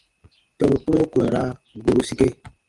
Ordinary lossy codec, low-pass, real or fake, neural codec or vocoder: Opus, 24 kbps; 10.8 kHz; fake; vocoder, 24 kHz, 100 mel bands, Vocos